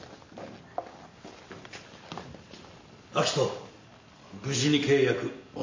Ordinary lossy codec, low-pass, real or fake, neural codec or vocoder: MP3, 32 kbps; 7.2 kHz; real; none